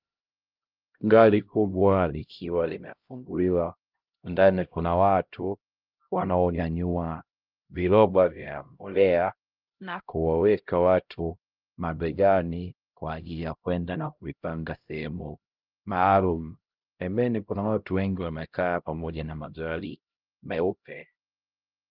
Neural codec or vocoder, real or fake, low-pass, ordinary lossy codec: codec, 16 kHz, 0.5 kbps, X-Codec, HuBERT features, trained on LibriSpeech; fake; 5.4 kHz; Opus, 24 kbps